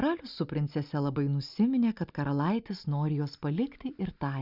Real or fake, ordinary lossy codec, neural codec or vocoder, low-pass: real; Opus, 64 kbps; none; 5.4 kHz